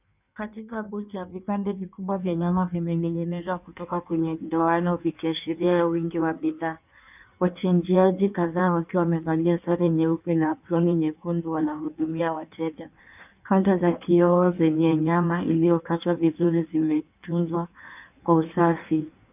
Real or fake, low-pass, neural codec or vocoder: fake; 3.6 kHz; codec, 16 kHz in and 24 kHz out, 1.1 kbps, FireRedTTS-2 codec